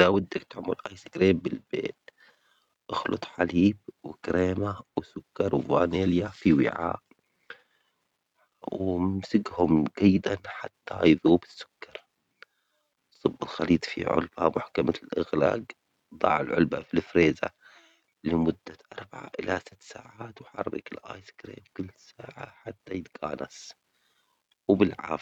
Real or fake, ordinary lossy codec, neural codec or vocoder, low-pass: real; none; none; 19.8 kHz